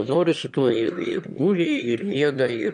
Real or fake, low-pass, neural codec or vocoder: fake; 9.9 kHz; autoencoder, 22.05 kHz, a latent of 192 numbers a frame, VITS, trained on one speaker